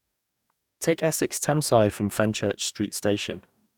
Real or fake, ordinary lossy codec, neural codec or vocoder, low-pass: fake; none; codec, 44.1 kHz, 2.6 kbps, DAC; 19.8 kHz